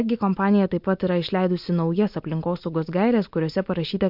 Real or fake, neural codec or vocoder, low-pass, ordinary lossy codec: real; none; 5.4 kHz; MP3, 48 kbps